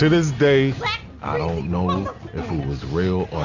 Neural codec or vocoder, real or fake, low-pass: none; real; 7.2 kHz